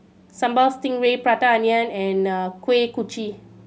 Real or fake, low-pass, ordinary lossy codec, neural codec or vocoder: real; none; none; none